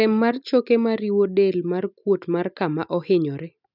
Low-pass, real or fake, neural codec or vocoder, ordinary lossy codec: 5.4 kHz; real; none; none